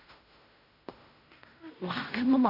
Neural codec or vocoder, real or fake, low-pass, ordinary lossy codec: codec, 16 kHz in and 24 kHz out, 0.4 kbps, LongCat-Audio-Codec, fine tuned four codebook decoder; fake; 5.4 kHz; none